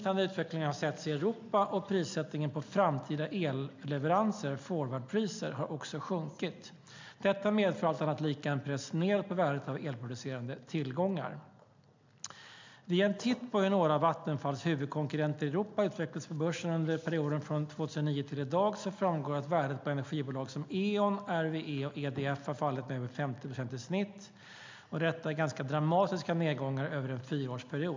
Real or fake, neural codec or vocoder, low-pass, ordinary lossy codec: real; none; 7.2 kHz; MP3, 64 kbps